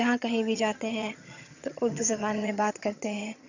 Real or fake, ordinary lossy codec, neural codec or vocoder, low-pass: fake; none; vocoder, 22.05 kHz, 80 mel bands, HiFi-GAN; 7.2 kHz